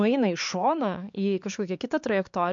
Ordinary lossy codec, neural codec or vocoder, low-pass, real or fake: MP3, 48 kbps; codec, 16 kHz, 4 kbps, X-Codec, HuBERT features, trained on LibriSpeech; 7.2 kHz; fake